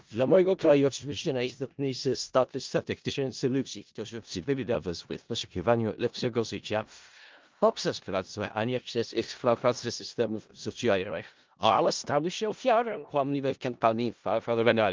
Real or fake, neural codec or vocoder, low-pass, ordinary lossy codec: fake; codec, 16 kHz in and 24 kHz out, 0.4 kbps, LongCat-Audio-Codec, four codebook decoder; 7.2 kHz; Opus, 32 kbps